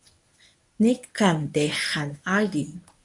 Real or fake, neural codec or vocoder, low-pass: fake; codec, 24 kHz, 0.9 kbps, WavTokenizer, medium speech release version 1; 10.8 kHz